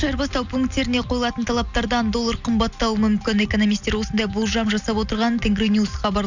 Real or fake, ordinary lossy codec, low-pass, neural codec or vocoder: real; none; 7.2 kHz; none